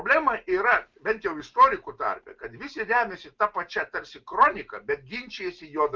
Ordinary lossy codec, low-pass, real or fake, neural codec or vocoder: Opus, 24 kbps; 7.2 kHz; real; none